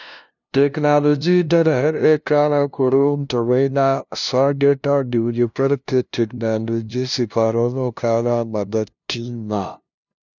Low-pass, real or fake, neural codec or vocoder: 7.2 kHz; fake; codec, 16 kHz, 0.5 kbps, FunCodec, trained on LibriTTS, 25 frames a second